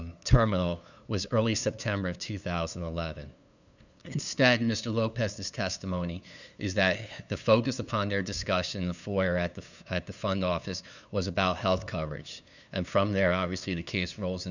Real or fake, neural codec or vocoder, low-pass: fake; codec, 16 kHz, 2 kbps, FunCodec, trained on LibriTTS, 25 frames a second; 7.2 kHz